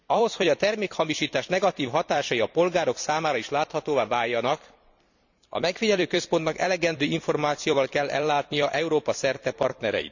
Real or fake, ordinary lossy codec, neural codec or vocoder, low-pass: fake; none; vocoder, 44.1 kHz, 128 mel bands every 256 samples, BigVGAN v2; 7.2 kHz